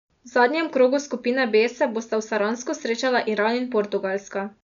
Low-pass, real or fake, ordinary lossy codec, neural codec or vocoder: 7.2 kHz; real; none; none